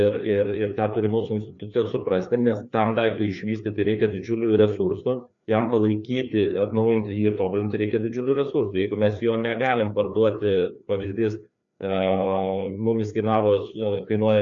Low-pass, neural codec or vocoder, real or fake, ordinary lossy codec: 7.2 kHz; codec, 16 kHz, 2 kbps, FreqCodec, larger model; fake; MP3, 64 kbps